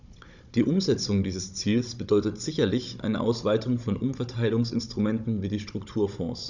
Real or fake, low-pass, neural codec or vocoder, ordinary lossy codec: fake; 7.2 kHz; codec, 16 kHz, 16 kbps, FunCodec, trained on Chinese and English, 50 frames a second; none